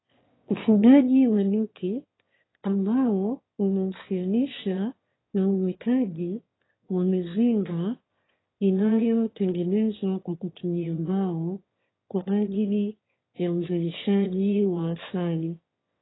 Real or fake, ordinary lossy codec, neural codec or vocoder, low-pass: fake; AAC, 16 kbps; autoencoder, 22.05 kHz, a latent of 192 numbers a frame, VITS, trained on one speaker; 7.2 kHz